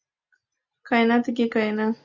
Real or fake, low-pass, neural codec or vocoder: real; 7.2 kHz; none